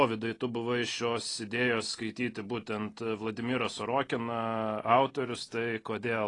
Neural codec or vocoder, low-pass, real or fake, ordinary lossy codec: none; 10.8 kHz; real; AAC, 32 kbps